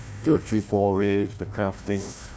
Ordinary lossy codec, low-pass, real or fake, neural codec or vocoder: none; none; fake; codec, 16 kHz, 1 kbps, FunCodec, trained on Chinese and English, 50 frames a second